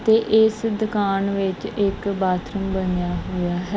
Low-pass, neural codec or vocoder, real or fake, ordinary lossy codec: none; none; real; none